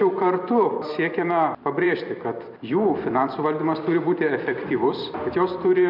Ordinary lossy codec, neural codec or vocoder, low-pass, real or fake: MP3, 48 kbps; vocoder, 44.1 kHz, 128 mel bands every 256 samples, BigVGAN v2; 5.4 kHz; fake